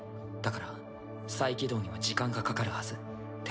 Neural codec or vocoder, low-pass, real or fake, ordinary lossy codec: none; none; real; none